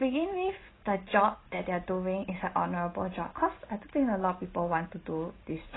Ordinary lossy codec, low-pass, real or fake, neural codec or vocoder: AAC, 16 kbps; 7.2 kHz; real; none